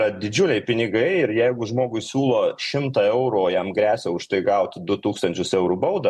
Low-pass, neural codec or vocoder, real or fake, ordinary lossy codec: 14.4 kHz; none; real; MP3, 64 kbps